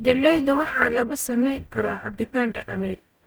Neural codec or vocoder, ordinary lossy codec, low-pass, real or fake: codec, 44.1 kHz, 0.9 kbps, DAC; none; none; fake